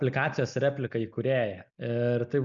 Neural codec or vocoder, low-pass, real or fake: none; 7.2 kHz; real